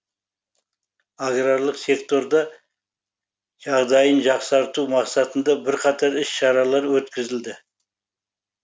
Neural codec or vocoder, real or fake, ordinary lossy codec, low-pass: none; real; none; none